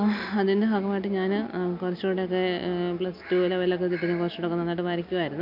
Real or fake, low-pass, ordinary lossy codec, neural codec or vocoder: real; 5.4 kHz; none; none